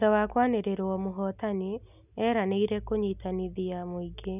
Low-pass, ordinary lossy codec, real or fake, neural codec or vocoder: 3.6 kHz; none; real; none